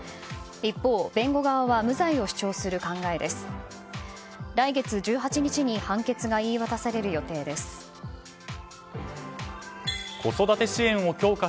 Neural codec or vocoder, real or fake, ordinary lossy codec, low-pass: none; real; none; none